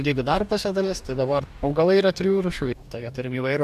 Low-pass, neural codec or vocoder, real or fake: 14.4 kHz; codec, 44.1 kHz, 2.6 kbps, DAC; fake